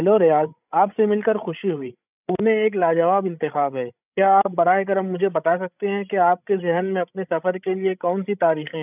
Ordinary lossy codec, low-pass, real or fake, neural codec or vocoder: none; 3.6 kHz; fake; codec, 16 kHz, 16 kbps, FreqCodec, larger model